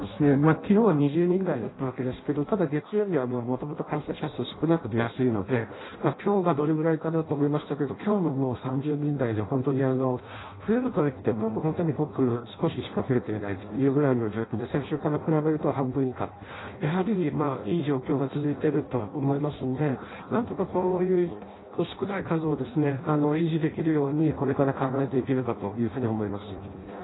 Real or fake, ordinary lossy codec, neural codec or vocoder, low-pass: fake; AAC, 16 kbps; codec, 16 kHz in and 24 kHz out, 0.6 kbps, FireRedTTS-2 codec; 7.2 kHz